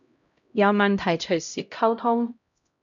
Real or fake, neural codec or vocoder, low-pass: fake; codec, 16 kHz, 0.5 kbps, X-Codec, HuBERT features, trained on LibriSpeech; 7.2 kHz